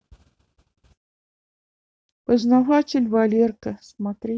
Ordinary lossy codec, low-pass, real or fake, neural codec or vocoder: none; none; real; none